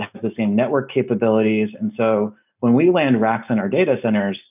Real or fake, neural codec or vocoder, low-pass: real; none; 3.6 kHz